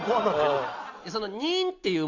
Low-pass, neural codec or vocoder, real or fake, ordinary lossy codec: 7.2 kHz; none; real; AAC, 48 kbps